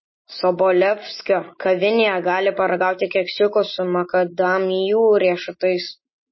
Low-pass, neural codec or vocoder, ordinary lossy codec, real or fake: 7.2 kHz; none; MP3, 24 kbps; real